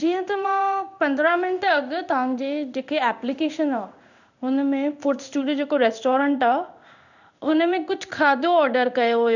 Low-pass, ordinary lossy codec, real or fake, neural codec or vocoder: 7.2 kHz; none; fake; codec, 16 kHz in and 24 kHz out, 1 kbps, XY-Tokenizer